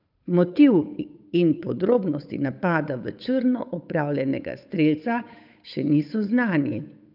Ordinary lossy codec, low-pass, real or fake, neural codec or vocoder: none; 5.4 kHz; fake; codec, 16 kHz, 8 kbps, FunCodec, trained on Chinese and English, 25 frames a second